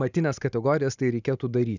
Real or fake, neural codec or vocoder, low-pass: real; none; 7.2 kHz